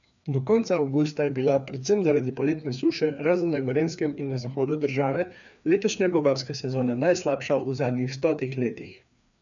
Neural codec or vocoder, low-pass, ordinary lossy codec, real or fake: codec, 16 kHz, 2 kbps, FreqCodec, larger model; 7.2 kHz; none; fake